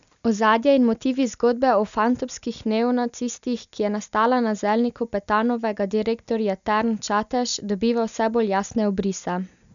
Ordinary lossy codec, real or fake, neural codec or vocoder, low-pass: none; real; none; 7.2 kHz